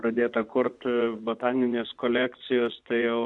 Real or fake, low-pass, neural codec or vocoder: fake; 10.8 kHz; vocoder, 24 kHz, 100 mel bands, Vocos